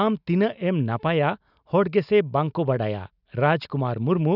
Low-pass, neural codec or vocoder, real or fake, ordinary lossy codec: 5.4 kHz; none; real; none